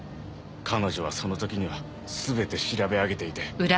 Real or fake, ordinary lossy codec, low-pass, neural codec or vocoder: real; none; none; none